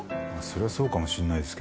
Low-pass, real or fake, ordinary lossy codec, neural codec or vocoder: none; real; none; none